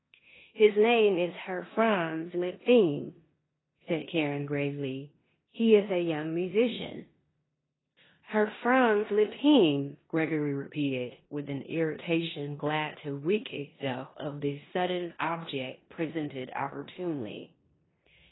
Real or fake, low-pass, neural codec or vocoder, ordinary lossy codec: fake; 7.2 kHz; codec, 16 kHz in and 24 kHz out, 0.9 kbps, LongCat-Audio-Codec, four codebook decoder; AAC, 16 kbps